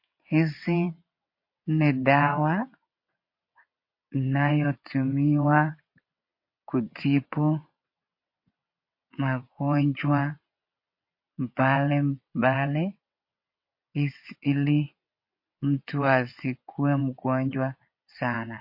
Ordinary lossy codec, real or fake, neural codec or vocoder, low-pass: MP3, 32 kbps; fake; vocoder, 22.05 kHz, 80 mel bands, WaveNeXt; 5.4 kHz